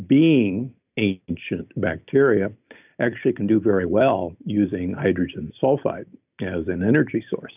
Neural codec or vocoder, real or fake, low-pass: none; real; 3.6 kHz